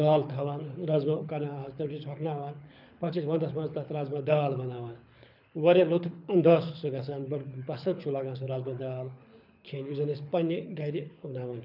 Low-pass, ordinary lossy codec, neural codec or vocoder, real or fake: 5.4 kHz; none; codec, 24 kHz, 6 kbps, HILCodec; fake